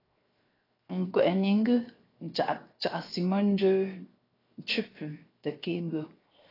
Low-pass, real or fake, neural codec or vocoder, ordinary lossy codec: 5.4 kHz; fake; codec, 16 kHz, 0.7 kbps, FocalCodec; AAC, 24 kbps